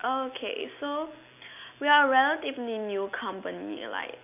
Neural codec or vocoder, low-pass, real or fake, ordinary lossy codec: none; 3.6 kHz; real; none